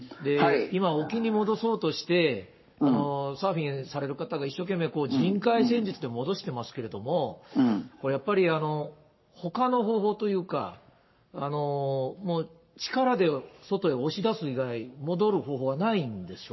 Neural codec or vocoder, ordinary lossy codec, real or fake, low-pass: codec, 44.1 kHz, 7.8 kbps, Pupu-Codec; MP3, 24 kbps; fake; 7.2 kHz